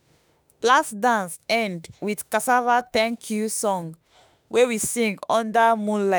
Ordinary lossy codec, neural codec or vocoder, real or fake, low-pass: none; autoencoder, 48 kHz, 32 numbers a frame, DAC-VAE, trained on Japanese speech; fake; none